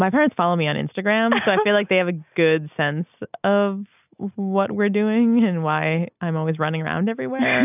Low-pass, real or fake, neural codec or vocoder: 3.6 kHz; real; none